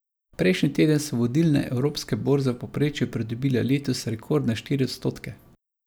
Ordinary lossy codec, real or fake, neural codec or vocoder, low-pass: none; real; none; none